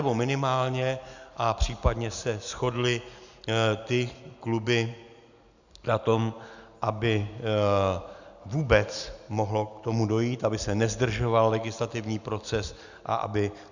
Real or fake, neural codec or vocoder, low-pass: real; none; 7.2 kHz